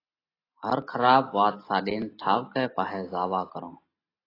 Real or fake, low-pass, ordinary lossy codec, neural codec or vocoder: real; 5.4 kHz; AAC, 24 kbps; none